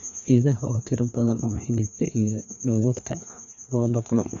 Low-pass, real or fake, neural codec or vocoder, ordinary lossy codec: 7.2 kHz; fake; codec, 16 kHz, 2 kbps, FreqCodec, larger model; none